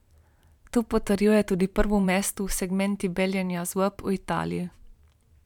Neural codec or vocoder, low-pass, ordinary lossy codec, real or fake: vocoder, 44.1 kHz, 128 mel bands every 512 samples, BigVGAN v2; 19.8 kHz; none; fake